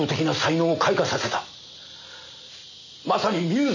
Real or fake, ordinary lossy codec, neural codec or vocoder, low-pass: real; none; none; 7.2 kHz